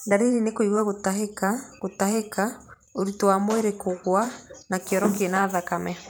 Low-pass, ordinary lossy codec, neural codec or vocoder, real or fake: none; none; vocoder, 44.1 kHz, 128 mel bands every 512 samples, BigVGAN v2; fake